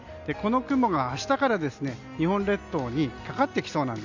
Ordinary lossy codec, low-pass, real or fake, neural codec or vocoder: Opus, 64 kbps; 7.2 kHz; real; none